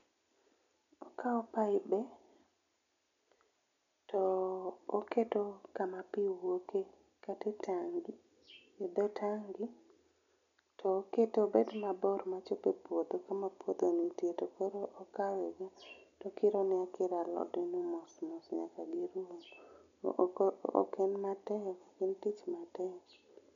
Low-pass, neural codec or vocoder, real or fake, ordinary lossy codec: 7.2 kHz; none; real; none